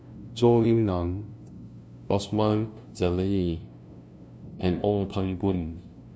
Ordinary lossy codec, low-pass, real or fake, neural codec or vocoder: none; none; fake; codec, 16 kHz, 0.5 kbps, FunCodec, trained on LibriTTS, 25 frames a second